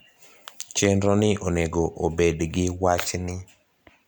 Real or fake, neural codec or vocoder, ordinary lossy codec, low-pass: real; none; none; none